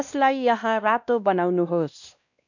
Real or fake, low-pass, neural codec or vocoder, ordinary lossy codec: fake; 7.2 kHz; codec, 16 kHz, 1 kbps, X-Codec, WavLM features, trained on Multilingual LibriSpeech; none